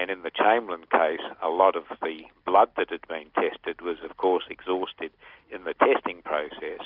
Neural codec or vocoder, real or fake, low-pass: none; real; 5.4 kHz